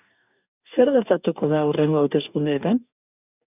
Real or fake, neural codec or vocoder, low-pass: fake; codec, 44.1 kHz, 2.6 kbps, DAC; 3.6 kHz